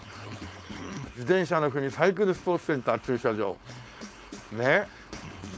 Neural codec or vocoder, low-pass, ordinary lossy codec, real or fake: codec, 16 kHz, 4.8 kbps, FACodec; none; none; fake